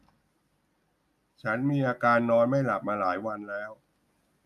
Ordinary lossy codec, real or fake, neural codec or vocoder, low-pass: none; real; none; 14.4 kHz